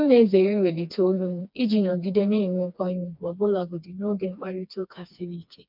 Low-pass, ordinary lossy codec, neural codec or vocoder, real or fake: 5.4 kHz; MP3, 48 kbps; codec, 16 kHz, 2 kbps, FreqCodec, smaller model; fake